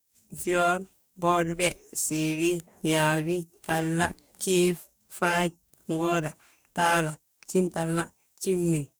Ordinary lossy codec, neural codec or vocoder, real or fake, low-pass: none; codec, 44.1 kHz, 2.6 kbps, DAC; fake; none